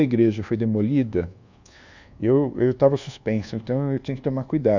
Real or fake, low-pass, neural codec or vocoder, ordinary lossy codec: fake; 7.2 kHz; codec, 24 kHz, 1.2 kbps, DualCodec; none